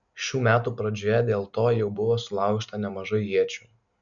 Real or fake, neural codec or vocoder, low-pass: real; none; 7.2 kHz